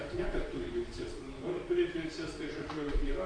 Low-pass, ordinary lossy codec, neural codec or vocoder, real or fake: 9.9 kHz; AAC, 32 kbps; vocoder, 44.1 kHz, 128 mel bands, Pupu-Vocoder; fake